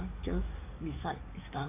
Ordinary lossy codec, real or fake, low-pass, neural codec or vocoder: AAC, 24 kbps; fake; 3.6 kHz; codec, 44.1 kHz, 7.8 kbps, DAC